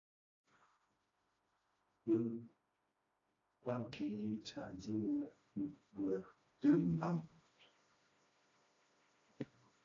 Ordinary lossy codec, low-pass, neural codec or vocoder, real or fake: AAC, 32 kbps; 7.2 kHz; codec, 16 kHz, 1 kbps, FreqCodec, smaller model; fake